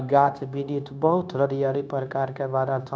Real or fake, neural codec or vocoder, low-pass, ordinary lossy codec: fake; codec, 16 kHz, 0.9 kbps, LongCat-Audio-Codec; none; none